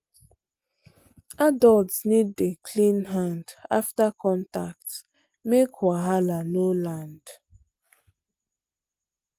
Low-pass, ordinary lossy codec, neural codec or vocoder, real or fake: 14.4 kHz; Opus, 32 kbps; none; real